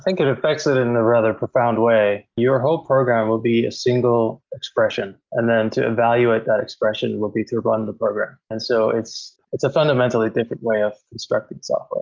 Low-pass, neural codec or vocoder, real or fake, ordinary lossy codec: 7.2 kHz; none; real; Opus, 32 kbps